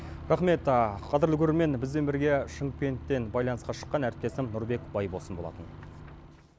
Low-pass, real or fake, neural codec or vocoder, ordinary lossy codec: none; real; none; none